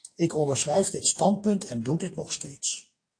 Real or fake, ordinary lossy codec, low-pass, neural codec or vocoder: fake; AAC, 48 kbps; 9.9 kHz; codec, 44.1 kHz, 2.6 kbps, DAC